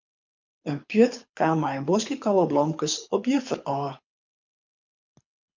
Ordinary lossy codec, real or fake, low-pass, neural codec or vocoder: MP3, 64 kbps; fake; 7.2 kHz; codec, 24 kHz, 6 kbps, HILCodec